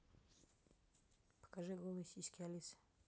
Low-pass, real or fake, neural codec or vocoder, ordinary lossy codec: none; real; none; none